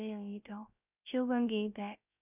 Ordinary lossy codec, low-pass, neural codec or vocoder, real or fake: none; 3.6 kHz; codec, 16 kHz, 0.3 kbps, FocalCodec; fake